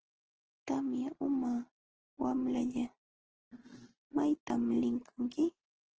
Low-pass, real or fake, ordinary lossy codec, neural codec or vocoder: 7.2 kHz; real; Opus, 16 kbps; none